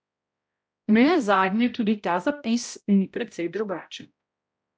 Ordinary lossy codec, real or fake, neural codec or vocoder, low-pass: none; fake; codec, 16 kHz, 0.5 kbps, X-Codec, HuBERT features, trained on balanced general audio; none